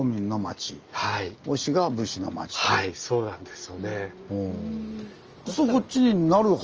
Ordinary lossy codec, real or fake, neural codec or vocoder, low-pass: Opus, 24 kbps; real; none; 7.2 kHz